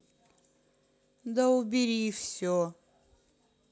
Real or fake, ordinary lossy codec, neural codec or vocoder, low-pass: real; none; none; none